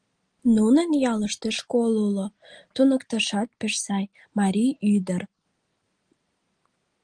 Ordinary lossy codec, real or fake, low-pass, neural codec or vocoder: Opus, 24 kbps; real; 9.9 kHz; none